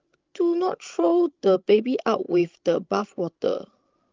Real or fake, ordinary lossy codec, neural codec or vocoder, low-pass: fake; Opus, 24 kbps; codec, 16 kHz, 16 kbps, FreqCodec, larger model; 7.2 kHz